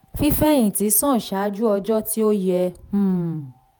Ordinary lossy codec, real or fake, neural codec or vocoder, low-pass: none; fake; vocoder, 48 kHz, 128 mel bands, Vocos; none